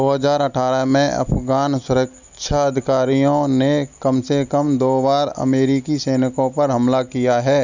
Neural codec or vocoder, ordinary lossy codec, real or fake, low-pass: none; none; real; 7.2 kHz